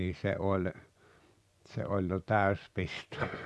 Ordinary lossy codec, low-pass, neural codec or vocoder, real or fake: none; none; vocoder, 24 kHz, 100 mel bands, Vocos; fake